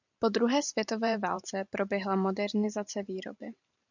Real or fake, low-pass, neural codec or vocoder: fake; 7.2 kHz; vocoder, 44.1 kHz, 128 mel bands every 512 samples, BigVGAN v2